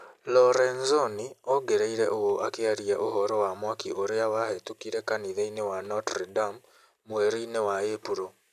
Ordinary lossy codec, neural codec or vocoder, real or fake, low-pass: none; none; real; 14.4 kHz